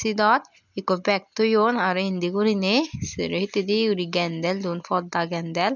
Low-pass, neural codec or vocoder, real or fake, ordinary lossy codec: 7.2 kHz; none; real; none